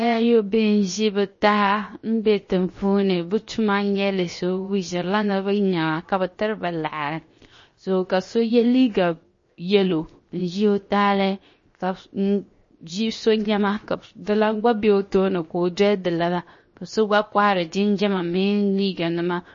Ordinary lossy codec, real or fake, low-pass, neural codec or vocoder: MP3, 32 kbps; fake; 7.2 kHz; codec, 16 kHz, 0.7 kbps, FocalCodec